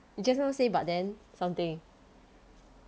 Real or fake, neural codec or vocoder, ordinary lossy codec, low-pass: real; none; none; none